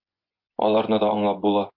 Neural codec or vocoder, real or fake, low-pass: vocoder, 24 kHz, 100 mel bands, Vocos; fake; 5.4 kHz